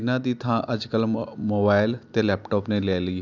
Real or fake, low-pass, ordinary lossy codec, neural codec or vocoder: real; 7.2 kHz; none; none